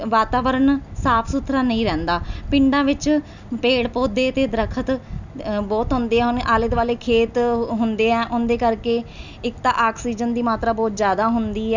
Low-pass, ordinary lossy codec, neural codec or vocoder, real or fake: 7.2 kHz; none; none; real